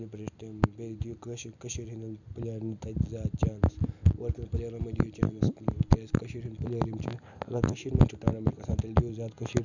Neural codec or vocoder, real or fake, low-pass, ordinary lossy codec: none; real; 7.2 kHz; none